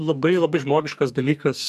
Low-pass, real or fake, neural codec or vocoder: 14.4 kHz; fake; codec, 44.1 kHz, 2.6 kbps, SNAC